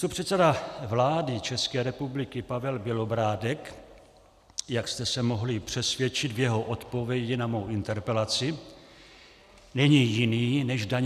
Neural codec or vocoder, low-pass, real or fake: none; 14.4 kHz; real